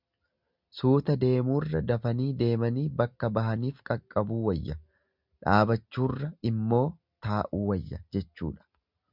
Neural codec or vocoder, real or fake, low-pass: none; real; 5.4 kHz